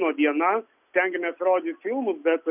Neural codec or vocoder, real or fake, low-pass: none; real; 3.6 kHz